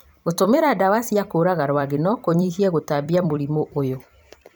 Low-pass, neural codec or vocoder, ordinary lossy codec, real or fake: none; vocoder, 44.1 kHz, 128 mel bands every 256 samples, BigVGAN v2; none; fake